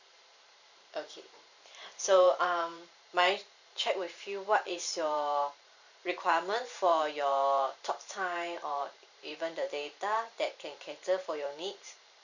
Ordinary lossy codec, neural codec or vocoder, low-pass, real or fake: none; vocoder, 44.1 kHz, 128 mel bands every 512 samples, BigVGAN v2; 7.2 kHz; fake